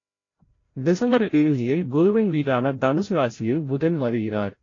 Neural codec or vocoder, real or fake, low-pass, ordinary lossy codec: codec, 16 kHz, 0.5 kbps, FreqCodec, larger model; fake; 7.2 kHz; AAC, 32 kbps